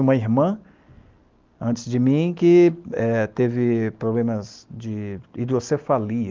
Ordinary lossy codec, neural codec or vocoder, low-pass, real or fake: Opus, 32 kbps; none; 7.2 kHz; real